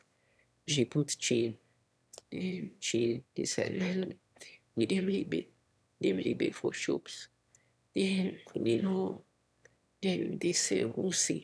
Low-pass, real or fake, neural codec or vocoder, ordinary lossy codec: none; fake; autoencoder, 22.05 kHz, a latent of 192 numbers a frame, VITS, trained on one speaker; none